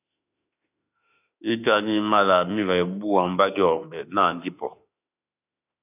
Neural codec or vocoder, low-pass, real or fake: autoencoder, 48 kHz, 32 numbers a frame, DAC-VAE, trained on Japanese speech; 3.6 kHz; fake